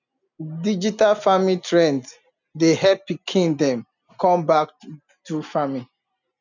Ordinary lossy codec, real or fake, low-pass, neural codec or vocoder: none; real; 7.2 kHz; none